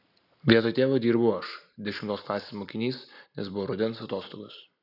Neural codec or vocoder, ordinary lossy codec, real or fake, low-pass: none; AAC, 48 kbps; real; 5.4 kHz